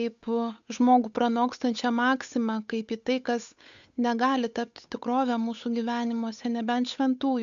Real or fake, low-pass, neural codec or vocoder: fake; 7.2 kHz; codec, 16 kHz, 8 kbps, FunCodec, trained on Chinese and English, 25 frames a second